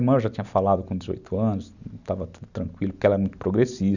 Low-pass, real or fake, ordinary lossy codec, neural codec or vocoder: 7.2 kHz; real; none; none